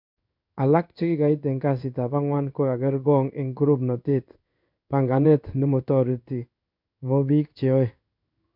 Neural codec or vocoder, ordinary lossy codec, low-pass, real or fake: codec, 16 kHz in and 24 kHz out, 1 kbps, XY-Tokenizer; none; 5.4 kHz; fake